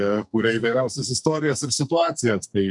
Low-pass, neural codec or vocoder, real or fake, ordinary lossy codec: 10.8 kHz; codec, 44.1 kHz, 2.6 kbps, SNAC; fake; MP3, 96 kbps